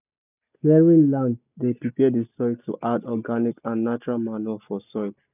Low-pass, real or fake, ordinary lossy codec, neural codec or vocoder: 3.6 kHz; real; none; none